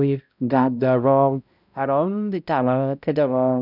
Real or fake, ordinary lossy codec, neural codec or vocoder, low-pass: fake; none; codec, 16 kHz, 0.5 kbps, X-Codec, HuBERT features, trained on balanced general audio; 5.4 kHz